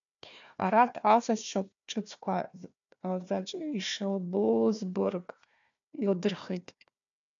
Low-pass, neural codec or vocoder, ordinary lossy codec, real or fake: 7.2 kHz; codec, 16 kHz, 1 kbps, FunCodec, trained on Chinese and English, 50 frames a second; MP3, 64 kbps; fake